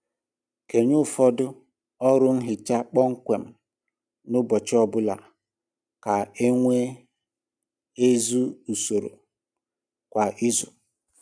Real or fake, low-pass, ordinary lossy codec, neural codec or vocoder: real; 9.9 kHz; none; none